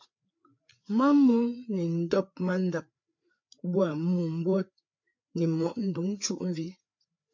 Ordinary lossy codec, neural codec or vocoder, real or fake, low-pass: MP3, 48 kbps; codec, 16 kHz, 4 kbps, FreqCodec, larger model; fake; 7.2 kHz